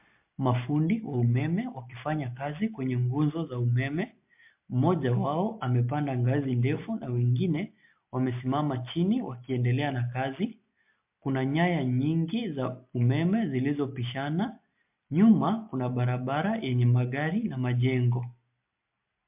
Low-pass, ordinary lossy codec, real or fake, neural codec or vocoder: 3.6 kHz; MP3, 32 kbps; real; none